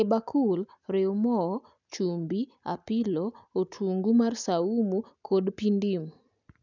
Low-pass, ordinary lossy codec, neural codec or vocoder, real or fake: 7.2 kHz; none; none; real